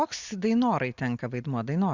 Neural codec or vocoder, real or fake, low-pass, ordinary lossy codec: none; real; 7.2 kHz; Opus, 64 kbps